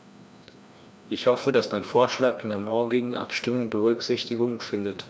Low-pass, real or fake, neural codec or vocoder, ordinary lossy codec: none; fake; codec, 16 kHz, 1 kbps, FreqCodec, larger model; none